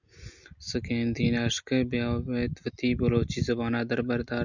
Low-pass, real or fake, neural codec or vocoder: 7.2 kHz; real; none